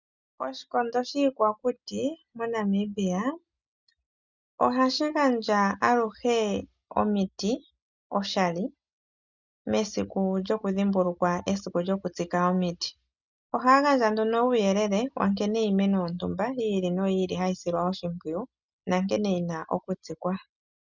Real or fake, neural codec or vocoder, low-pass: real; none; 7.2 kHz